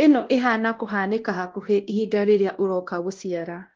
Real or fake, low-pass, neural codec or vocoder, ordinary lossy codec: fake; 7.2 kHz; codec, 16 kHz, 1 kbps, X-Codec, WavLM features, trained on Multilingual LibriSpeech; Opus, 16 kbps